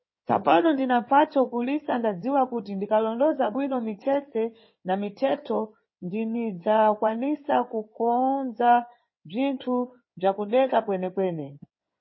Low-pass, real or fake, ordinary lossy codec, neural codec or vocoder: 7.2 kHz; fake; MP3, 24 kbps; codec, 16 kHz in and 24 kHz out, 2.2 kbps, FireRedTTS-2 codec